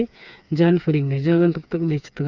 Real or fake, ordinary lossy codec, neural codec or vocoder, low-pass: fake; none; codec, 16 kHz, 4 kbps, FreqCodec, smaller model; 7.2 kHz